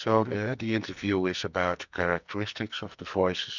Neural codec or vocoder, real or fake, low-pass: codec, 44.1 kHz, 2.6 kbps, SNAC; fake; 7.2 kHz